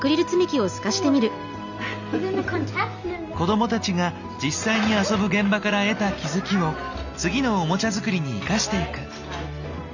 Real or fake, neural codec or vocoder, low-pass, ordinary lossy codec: real; none; 7.2 kHz; none